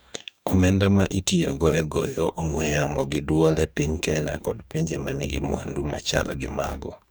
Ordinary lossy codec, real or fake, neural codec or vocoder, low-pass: none; fake; codec, 44.1 kHz, 2.6 kbps, DAC; none